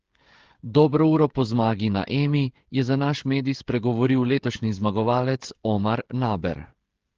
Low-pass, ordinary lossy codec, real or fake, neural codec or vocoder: 7.2 kHz; Opus, 16 kbps; fake; codec, 16 kHz, 16 kbps, FreqCodec, smaller model